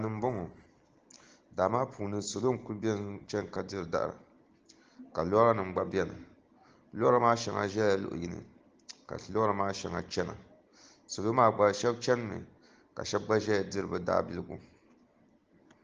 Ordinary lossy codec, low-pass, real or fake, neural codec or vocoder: Opus, 16 kbps; 7.2 kHz; real; none